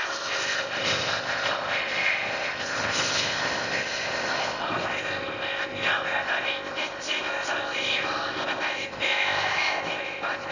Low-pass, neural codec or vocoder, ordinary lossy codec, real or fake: 7.2 kHz; codec, 16 kHz in and 24 kHz out, 0.6 kbps, FocalCodec, streaming, 4096 codes; none; fake